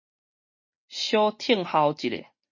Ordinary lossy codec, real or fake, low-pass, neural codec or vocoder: MP3, 32 kbps; real; 7.2 kHz; none